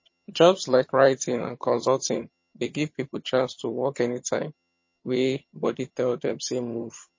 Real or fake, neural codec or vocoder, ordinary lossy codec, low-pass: fake; vocoder, 22.05 kHz, 80 mel bands, HiFi-GAN; MP3, 32 kbps; 7.2 kHz